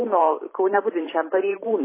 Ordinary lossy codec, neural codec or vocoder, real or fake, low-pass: MP3, 16 kbps; vocoder, 44.1 kHz, 128 mel bands every 512 samples, BigVGAN v2; fake; 3.6 kHz